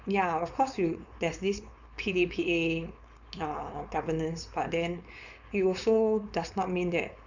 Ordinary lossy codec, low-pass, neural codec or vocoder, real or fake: none; 7.2 kHz; codec, 16 kHz, 4.8 kbps, FACodec; fake